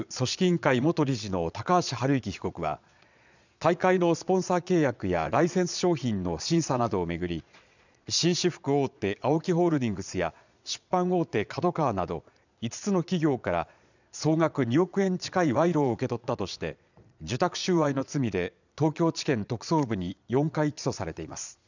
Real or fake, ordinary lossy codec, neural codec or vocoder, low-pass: fake; none; vocoder, 22.05 kHz, 80 mel bands, Vocos; 7.2 kHz